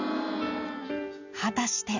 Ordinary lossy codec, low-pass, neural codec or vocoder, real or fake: none; 7.2 kHz; none; real